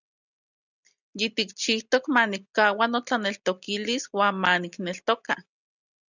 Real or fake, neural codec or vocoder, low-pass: real; none; 7.2 kHz